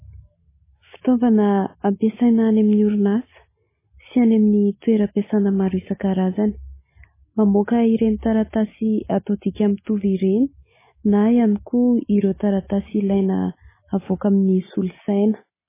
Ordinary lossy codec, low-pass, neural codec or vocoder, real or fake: MP3, 16 kbps; 3.6 kHz; none; real